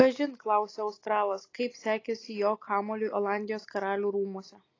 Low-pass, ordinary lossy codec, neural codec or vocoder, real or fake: 7.2 kHz; AAC, 32 kbps; none; real